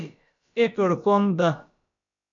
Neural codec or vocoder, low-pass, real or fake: codec, 16 kHz, about 1 kbps, DyCAST, with the encoder's durations; 7.2 kHz; fake